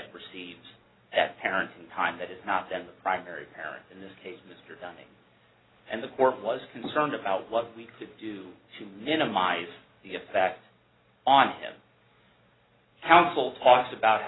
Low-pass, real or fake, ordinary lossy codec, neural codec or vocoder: 7.2 kHz; real; AAC, 16 kbps; none